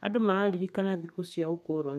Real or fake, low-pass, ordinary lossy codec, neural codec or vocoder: fake; 14.4 kHz; none; codec, 32 kHz, 1.9 kbps, SNAC